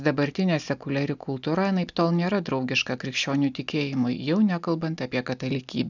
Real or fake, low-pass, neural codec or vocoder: real; 7.2 kHz; none